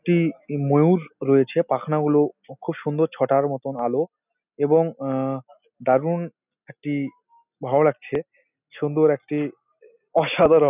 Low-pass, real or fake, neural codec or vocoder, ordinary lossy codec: 3.6 kHz; real; none; none